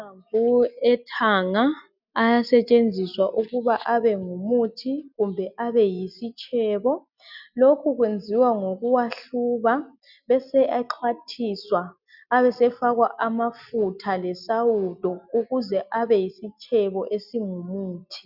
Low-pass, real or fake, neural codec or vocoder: 5.4 kHz; real; none